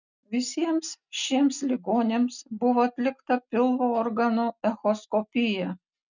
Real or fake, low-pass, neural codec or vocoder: real; 7.2 kHz; none